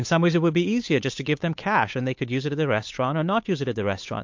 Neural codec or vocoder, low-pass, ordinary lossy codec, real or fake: codec, 16 kHz, 8 kbps, FunCodec, trained on Chinese and English, 25 frames a second; 7.2 kHz; MP3, 48 kbps; fake